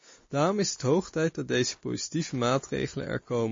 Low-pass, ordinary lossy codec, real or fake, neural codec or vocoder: 7.2 kHz; MP3, 32 kbps; real; none